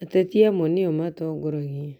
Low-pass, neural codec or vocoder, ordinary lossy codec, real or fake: 19.8 kHz; none; none; real